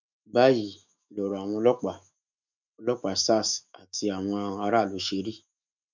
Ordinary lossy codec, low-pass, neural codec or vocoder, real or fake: none; 7.2 kHz; autoencoder, 48 kHz, 128 numbers a frame, DAC-VAE, trained on Japanese speech; fake